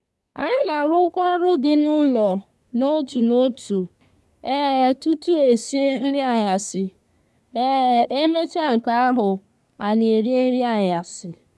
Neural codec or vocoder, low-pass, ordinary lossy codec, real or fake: codec, 24 kHz, 1 kbps, SNAC; none; none; fake